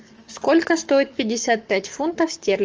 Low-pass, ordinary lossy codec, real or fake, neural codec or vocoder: 7.2 kHz; Opus, 24 kbps; fake; codec, 44.1 kHz, 7.8 kbps, Pupu-Codec